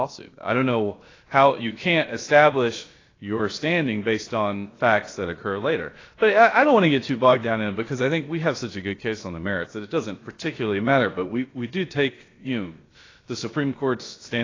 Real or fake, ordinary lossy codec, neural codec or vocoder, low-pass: fake; AAC, 32 kbps; codec, 16 kHz, about 1 kbps, DyCAST, with the encoder's durations; 7.2 kHz